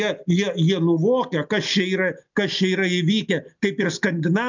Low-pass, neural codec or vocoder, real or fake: 7.2 kHz; none; real